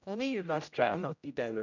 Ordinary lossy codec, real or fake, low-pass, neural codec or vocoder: none; fake; 7.2 kHz; codec, 16 kHz, 0.5 kbps, X-Codec, HuBERT features, trained on general audio